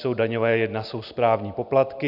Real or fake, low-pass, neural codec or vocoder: real; 5.4 kHz; none